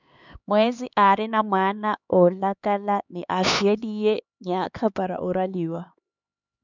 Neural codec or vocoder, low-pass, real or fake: codec, 16 kHz, 4 kbps, X-Codec, HuBERT features, trained on LibriSpeech; 7.2 kHz; fake